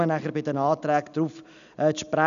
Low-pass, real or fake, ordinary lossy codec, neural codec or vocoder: 7.2 kHz; real; none; none